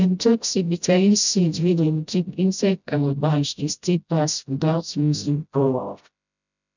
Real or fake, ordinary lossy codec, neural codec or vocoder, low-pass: fake; none; codec, 16 kHz, 0.5 kbps, FreqCodec, smaller model; 7.2 kHz